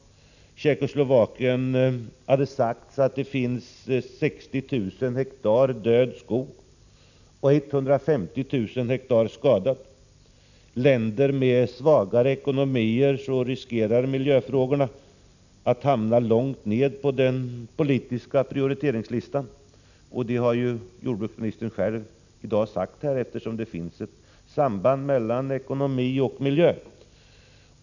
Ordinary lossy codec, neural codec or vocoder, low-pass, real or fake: none; none; 7.2 kHz; real